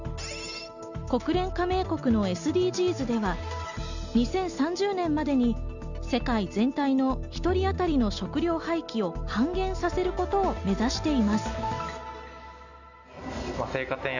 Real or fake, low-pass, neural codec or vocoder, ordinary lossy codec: real; 7.2 kHz; none; none